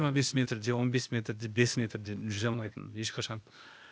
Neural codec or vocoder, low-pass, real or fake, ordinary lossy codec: codec, 16 kHz, 0.8 kbps, ZipCodec; none; fake; none